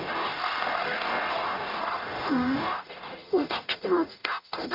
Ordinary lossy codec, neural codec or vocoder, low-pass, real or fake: AAC, 48 kbps; codec, 44.1 kHz, 0.9 kbps, DAC; 5.4 kHz; fake